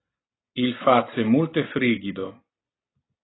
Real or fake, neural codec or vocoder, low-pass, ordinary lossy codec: real; none; 7.2 kHz; AAC, 16 kbps